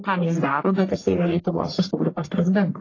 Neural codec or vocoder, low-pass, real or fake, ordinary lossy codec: codec, 44.1 kHz, 1.7 kbps, Pupu-Codec; 7.2 kHz; fake; AAC, 32 kbps